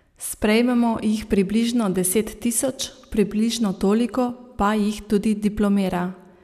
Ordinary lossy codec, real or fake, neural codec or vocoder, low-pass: none; real; none; 14.4 kHz